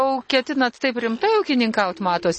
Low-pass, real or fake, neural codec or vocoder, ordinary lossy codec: 10.8 kHz; real; none; MP3, 32 kbps